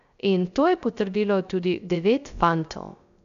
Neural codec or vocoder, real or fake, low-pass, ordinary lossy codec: codec, 16 kHz, 0.3 kbps, FocalCodec; fake; 7.2 kHz; none